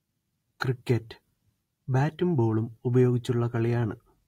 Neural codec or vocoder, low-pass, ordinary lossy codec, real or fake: none; 19.8 kHz; AAC, 48 kbps; real